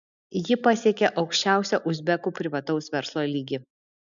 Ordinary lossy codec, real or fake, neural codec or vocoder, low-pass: MP3, 96 kbps; real; none; 7.2 kHz